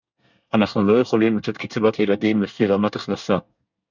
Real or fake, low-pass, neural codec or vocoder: fake; 7.2 kHz; codec, 24 kHz, 1 kbps, SNAC